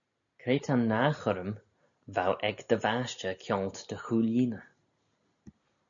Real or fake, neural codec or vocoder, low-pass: real; none; 7.2 kHz